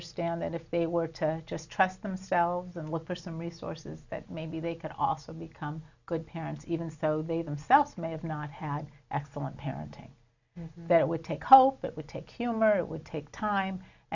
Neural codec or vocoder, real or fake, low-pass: none; real; 7.2 kHz